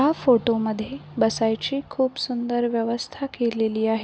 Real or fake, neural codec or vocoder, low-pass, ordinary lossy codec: real; none; none; none